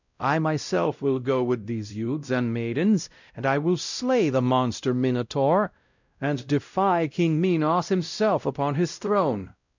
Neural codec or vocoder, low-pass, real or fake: codec, 16 kHz, 0.5 kbps, X-Codec, WavLM features, trained on Multilingual LibriSpeech; 7.2 kHz; fake